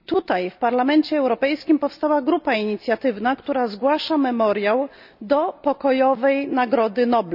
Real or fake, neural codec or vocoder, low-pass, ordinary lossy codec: real; none; 5.4 kHz; none